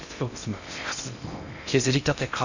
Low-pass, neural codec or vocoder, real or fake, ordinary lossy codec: 7.2 kHz; codec, 16 kHz in and 24 kHz out, 0.6 kbps, FocalCodec, streaming, 2048 codes; fake; none